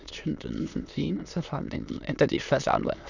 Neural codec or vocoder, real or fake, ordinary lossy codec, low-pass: autoencoder, 22.05 kHz, a latent of 192 numbers a frame, VITS, trained on many speakers; fake; none; 7.2 kHz